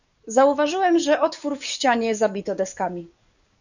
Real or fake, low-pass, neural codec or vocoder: fake; 7.2 kHz; codec, 44.1 kHz, 7.8 kbps, DAC